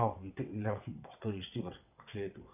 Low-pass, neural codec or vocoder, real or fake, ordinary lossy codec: 3.6 kHz; vocoder, 22.05 kHz, 80 mel bands, WaveNeXt; fake; none